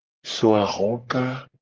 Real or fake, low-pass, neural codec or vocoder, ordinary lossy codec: fake; 7.2 kHz; codec, 44.1 kHz, 3.4 kbps, Pupu-Codec; Opus, 16 kbps